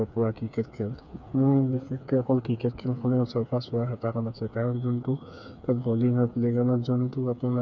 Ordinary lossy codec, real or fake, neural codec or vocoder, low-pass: none; fake; codec, 16 kHz, 4 kbps, FreqCodec, smaller model; 7.2 kHz